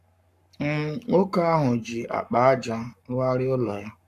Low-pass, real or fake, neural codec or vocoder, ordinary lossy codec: 14.4 kHz; fake; codec, 44.1 kHz, 7.8 kbps, Pupu-Codec; none